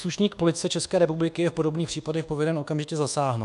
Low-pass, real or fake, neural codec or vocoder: 10.8 kHz; fake; codec, 24 kHz, 1.2 kbps, DualCodec